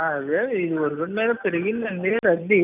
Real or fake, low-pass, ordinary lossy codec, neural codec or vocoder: real; 3.6 kHz; none; none